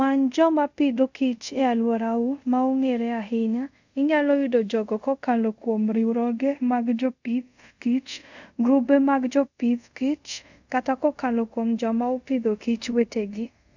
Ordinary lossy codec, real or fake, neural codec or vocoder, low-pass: none; fake; codec, 24 kHz, 0.5 kbps, DualCodec; 7.2 kHz